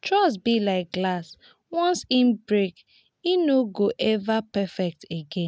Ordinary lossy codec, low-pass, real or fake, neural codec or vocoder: none; none; real; none